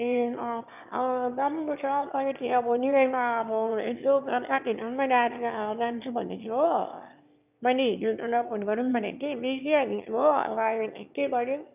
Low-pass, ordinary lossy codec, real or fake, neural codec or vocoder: 3.6 kHz; none; fake; autoencoder, 22.05 kHz, a latent of 192 numbers a frame, VITS, trained on one speaker